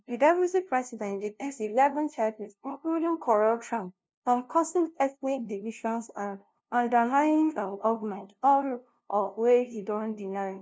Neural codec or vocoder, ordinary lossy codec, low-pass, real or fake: codec, 16 kHz, 0.5 kbps, FunCodec, trained on LibriTTS, 25 frames a second; none; none; fake